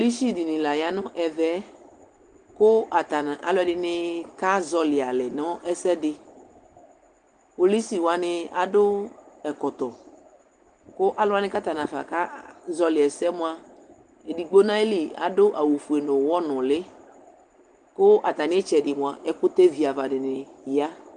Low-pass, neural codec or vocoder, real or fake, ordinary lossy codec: 9.9 kHz; none; real; Opus, 24 kbps